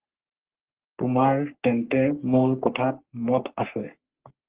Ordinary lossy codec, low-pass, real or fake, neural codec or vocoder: Opus, 16 kbps; 3.6 kHz; fake; codec, 44.1 kHz, 3.4 kbps, Pupu-Codec